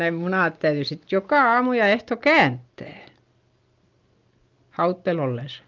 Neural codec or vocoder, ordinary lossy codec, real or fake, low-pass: none; Opus, 24 kbps; real; 7.2 kHz